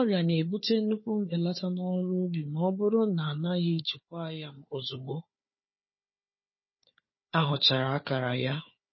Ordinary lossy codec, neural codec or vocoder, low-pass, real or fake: MP3, 24 kbps; codec, 16 kHz, 4 kbps, FunCodec, trained on Chinese and English, 50 frames a second; 7.2 kHz; fake